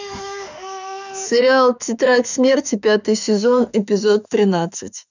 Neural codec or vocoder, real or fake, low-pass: autoencoder, 48 kHz, 32 numbers a frame, DAC-VAE, trained on Japanese speech; fake; 7.2 kHz